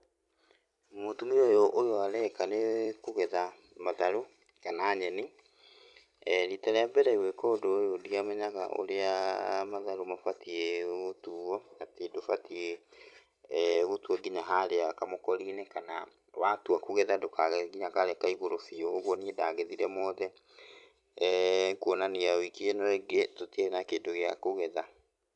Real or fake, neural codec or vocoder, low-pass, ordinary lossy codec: fake; vocoder, 44.1 kHz, 128 mel bands every 256 samples, BigVGAN v2; 10.8 kHz; none